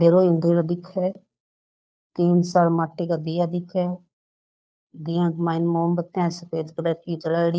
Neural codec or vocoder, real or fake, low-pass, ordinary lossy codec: codec, 16 kHz, 2 kbps, FunCodec, trained on Chinese and English, 25 frames a second; fake; none; none